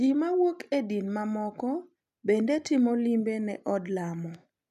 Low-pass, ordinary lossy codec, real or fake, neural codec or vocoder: 14.4 kHz; none; real; none